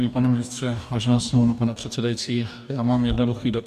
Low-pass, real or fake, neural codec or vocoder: 14.4 kHz; fake; codec, 44.1 kHz, 2.6 kbps, DAC